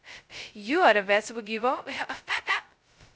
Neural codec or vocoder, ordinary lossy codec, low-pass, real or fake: codec, 16 kHz, 0.2 kbps, FocalCodec; none; none; fake